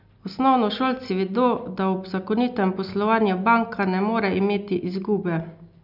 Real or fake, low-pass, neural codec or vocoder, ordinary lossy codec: real; 5.4 kHz; none; none